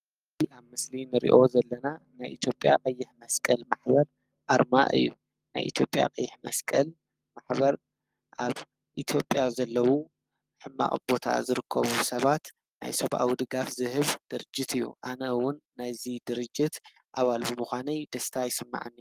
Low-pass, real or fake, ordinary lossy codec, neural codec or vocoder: 14.4 kHz; fake; Opus, 32 kbps; codec, 44.1 kHz, 7.8 kbps, Pupu-Codec